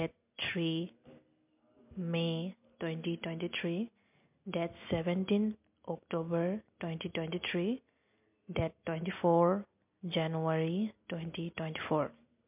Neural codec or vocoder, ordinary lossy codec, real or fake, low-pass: none; MP3, 32 kbps; real; 3.6 kHz